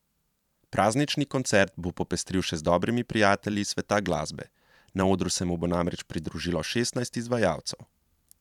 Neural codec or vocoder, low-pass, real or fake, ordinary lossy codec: vocoder, 44.1 kHz, 128 mel bands every 512 samples, BigVGAN v2; 19.8 kHz; fake; none